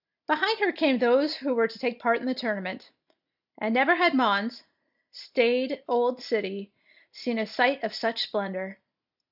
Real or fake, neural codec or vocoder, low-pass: real; none; 5.4 kHz